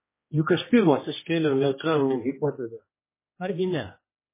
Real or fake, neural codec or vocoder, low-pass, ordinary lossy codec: fake; codec, 16 kHz, 1 kbps, X-Codec, HuBERT features, trained on general audio; 3.6 kHz; MP3, 16 kbps